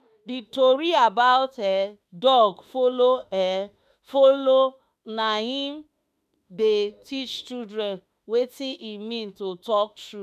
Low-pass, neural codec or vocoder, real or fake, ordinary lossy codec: 14.4 kHz; autoencoder, 48 kHz, 32 numbers a frame, DAC-VAE, trained on Japanese speech; fake; none